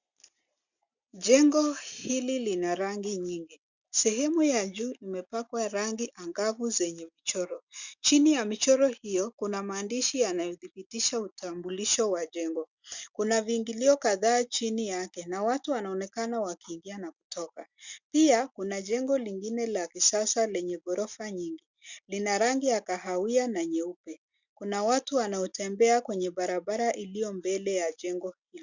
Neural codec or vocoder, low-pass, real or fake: none; 7.2 kHz; real